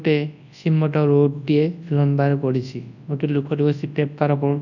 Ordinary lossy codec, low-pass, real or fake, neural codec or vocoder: none; 7.2 kHz; fake; codec, 24 kHz, 0.9 kbps, WavTokenizer, large speech release